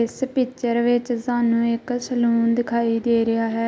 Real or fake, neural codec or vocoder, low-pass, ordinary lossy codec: real; none; none; none